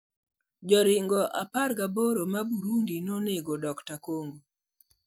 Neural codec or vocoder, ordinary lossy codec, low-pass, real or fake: none; none; none; real